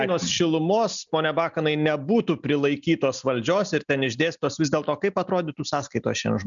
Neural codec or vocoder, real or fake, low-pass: none; real; 7.2 kHz